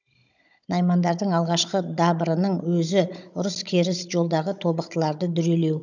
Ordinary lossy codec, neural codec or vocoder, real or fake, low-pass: none; codec, 16 kHz, 16 kbps, FunCodec, trained on Chinese and English, 50 frames a second; fake; 7.2 kHz